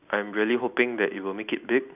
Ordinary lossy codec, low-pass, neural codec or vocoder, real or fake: none; 3.6 kHz; none; real